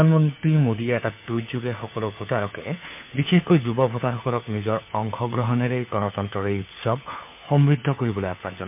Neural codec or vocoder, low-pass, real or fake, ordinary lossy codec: codec, 16 kHz, 4 kbps, FunCodec, trained on LibriTTS, 50 frames a second; 3.6 kHz; fake; MP3, 32 kbps